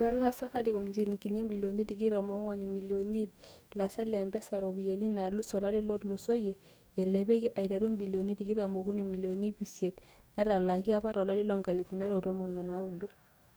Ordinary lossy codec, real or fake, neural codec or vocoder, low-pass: none; fake; codec, 44.1 kHz, 2.6 kbps, DAC; none